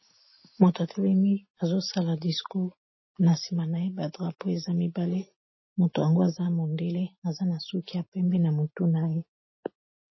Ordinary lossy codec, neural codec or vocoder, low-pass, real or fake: MP3, 24 kbps; none; 7.2 kHz; real